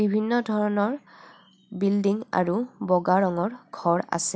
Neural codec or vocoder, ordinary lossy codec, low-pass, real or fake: none; none; none; real